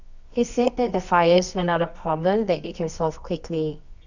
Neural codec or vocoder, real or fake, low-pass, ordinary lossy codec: codec, 24 kHz, 0.9 kbps, WavTokenizer, medium music audio release; fake; 7.2 kHz; none